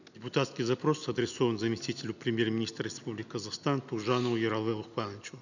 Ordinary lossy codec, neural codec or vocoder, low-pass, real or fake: none; none; 7.2 kHz; real